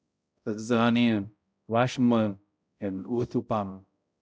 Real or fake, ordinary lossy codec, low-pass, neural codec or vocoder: fake; none; none; codec, 16 kHz, 0.5 kbps, X-Codec, HuBERT features, trained on balanced general audio